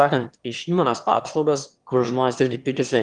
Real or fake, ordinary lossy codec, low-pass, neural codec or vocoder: fake; Opus, 24 kbps; 9.9 kHz; autoencoder, 22.05 kHz, a latent of 192 numbers a frame, VITS, trained on one speaker